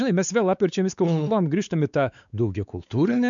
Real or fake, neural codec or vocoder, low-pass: fake; codec, 16 kHz, 4 kbps, X-Codec, WavLM features, trained on Multilingual LibriSpeech; 7.2 kHz